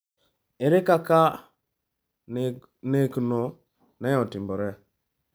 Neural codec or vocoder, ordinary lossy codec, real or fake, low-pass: none; none; real; none